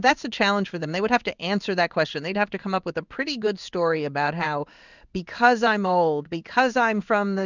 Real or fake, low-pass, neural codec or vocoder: fake; 7.2 kHz; codec, 16 kHz in and 24 kHz out, 1 kbps, XY-Tokenizer